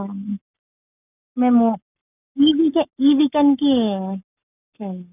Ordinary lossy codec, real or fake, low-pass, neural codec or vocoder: none; fake; 3.6 kHz; codec, 44.1 kHz, 7.8 kbps, Pupu-Codec